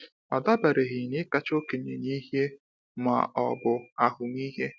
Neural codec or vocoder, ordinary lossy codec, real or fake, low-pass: none; none; real; none